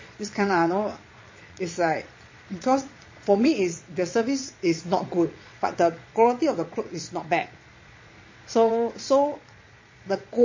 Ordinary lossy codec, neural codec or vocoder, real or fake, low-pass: MP3, 32 kbps; vocoder, 22.05 kHz, 80 mel bands, Vocos; fake; 7.2 kHz